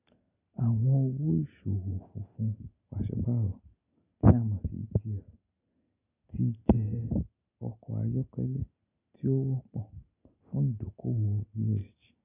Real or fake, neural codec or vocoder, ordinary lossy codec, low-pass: real; none; none; 3.6 kHz